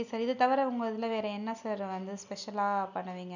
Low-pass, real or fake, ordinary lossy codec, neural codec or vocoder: 7.2 kHz; real; none; none